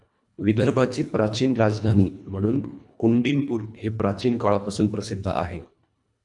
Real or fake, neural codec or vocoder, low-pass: fake; codec, 24 kHz, 1.5 kbps, HILCodec; 10.8 kHz